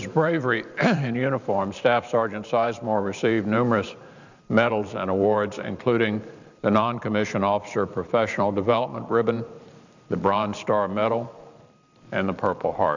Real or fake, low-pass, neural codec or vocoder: fake; 7.2 kHz; vocoder, 44.1 kHz, 128 mel bands every 512 samples, BigVGAN v2